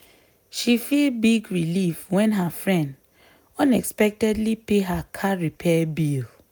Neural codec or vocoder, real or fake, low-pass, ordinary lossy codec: none; real; none; none